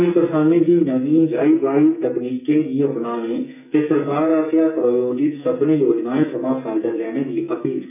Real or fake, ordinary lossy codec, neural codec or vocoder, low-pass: fake; none; codec, 44.1 kHz, 2.6 kbps, SNAC; 3.6 kHz